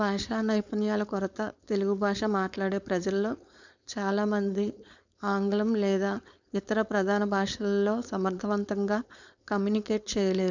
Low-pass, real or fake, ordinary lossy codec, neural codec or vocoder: 7.2 kHz; fake; none; codec, 16 kHz, 4.8 kbps, FACodec